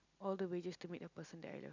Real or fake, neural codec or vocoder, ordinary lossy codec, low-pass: real; none; none; 7.2 kHz